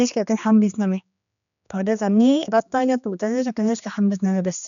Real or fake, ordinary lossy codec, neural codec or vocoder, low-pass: fake; none; codec, 16 kHz, 2 kbps, X-Codec, HuBERT features, trained on general audio; 7.2 kHz